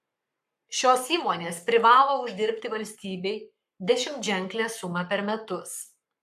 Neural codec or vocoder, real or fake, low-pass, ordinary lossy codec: codec, 44.1 kHz, 7.8 kbps, Pupu-Codec; fake; 14.4 kHz; AAC, 96 kbps